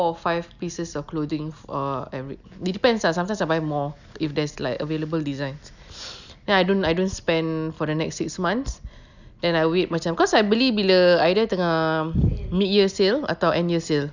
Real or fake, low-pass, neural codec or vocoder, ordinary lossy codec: real; 7.2 kHz; none; none